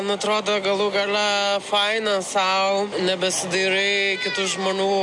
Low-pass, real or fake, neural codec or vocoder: 10.8 kHz; real; none